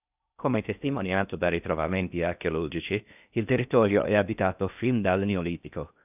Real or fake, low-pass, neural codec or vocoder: fake; 3.6 kHz; codec, 16 kHz in and 24 kHz out, 0.6 kbps, FocalCodec, streaming, 4096 codes